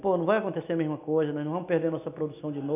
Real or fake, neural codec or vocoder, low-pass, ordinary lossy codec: real; none; 3.6 kHz; none